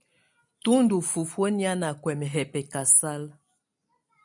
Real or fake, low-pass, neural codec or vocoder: real; 10.8 kHz; none